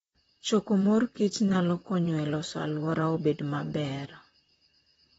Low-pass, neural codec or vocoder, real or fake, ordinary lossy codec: 19.8 kHz; vocoder, 44.1 kHz, 128 mel bands, Pupu-Vocoder; fake; AAC, 24 kbps